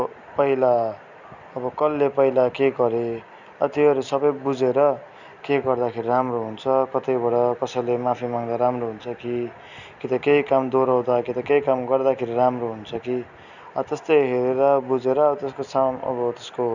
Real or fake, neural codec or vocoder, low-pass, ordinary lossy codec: real; none; 7.2 kHz; none